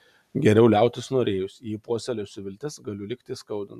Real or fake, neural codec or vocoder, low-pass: real; none; 14.4 kHz